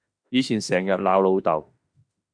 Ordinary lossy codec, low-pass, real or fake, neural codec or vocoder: AAC, 64 kbps; 9.9 kHz; fake; autoencoder, 48 kHz, 32 numbers a frame, DAC-VAE, trained on Japanese speech